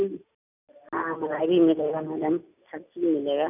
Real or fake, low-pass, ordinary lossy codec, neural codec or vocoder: real; 3.6 kHz; none; none